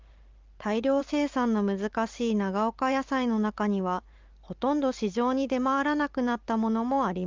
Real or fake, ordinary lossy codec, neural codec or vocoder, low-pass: fake; Opus, 32 kbps; vocoder, 44.1 kHz, 128 mel bands every 512 samples, BigVGAN v2; 7.2 kHz